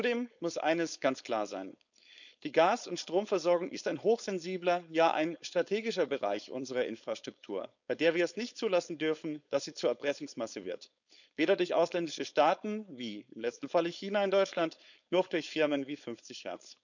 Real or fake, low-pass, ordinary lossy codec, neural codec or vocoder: fake; 7.2 kHz; none; codec, 16 kHz, 4.8 kbps, FACodec